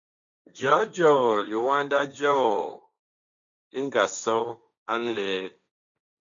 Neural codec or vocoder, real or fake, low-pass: codec, 16 kHz, 1.1 kbps, Voila-Tokenizer; fake; 7.2 kHz